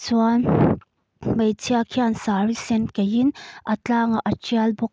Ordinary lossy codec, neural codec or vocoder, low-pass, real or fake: none; none; none; real